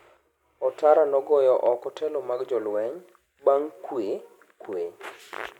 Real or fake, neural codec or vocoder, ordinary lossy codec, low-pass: real; none; none; 19.8 kHz